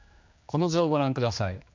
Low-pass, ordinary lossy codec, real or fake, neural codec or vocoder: 7.2 kHz; MP3, 64 kbps; fake; codec, 16 kHz, 2 kbps, X-Codec, HuBERT features, trained on general audio